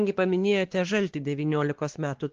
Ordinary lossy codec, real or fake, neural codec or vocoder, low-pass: Opus, 16 kbps; fake; codec, 16 kHz, 2 kbps, X-Codec, WavLM features, trained on Multilingual LibriSpeech; 7.2 kHz